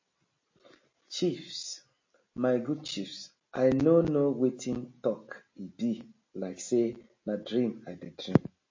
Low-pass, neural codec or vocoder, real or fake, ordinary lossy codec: 7.2 kHz; none; real; MP3, 32 kbps